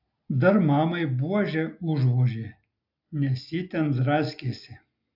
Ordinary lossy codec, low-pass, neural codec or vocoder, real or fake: AAC, 48 kbps; 5.4 kHz; none; real